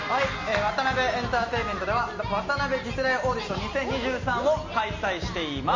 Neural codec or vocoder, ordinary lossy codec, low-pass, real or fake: none; MP3, 32 kbps; 7.2 kHz; real